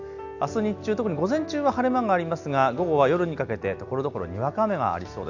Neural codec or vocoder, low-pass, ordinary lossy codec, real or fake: none; 7.2 kHz; none; real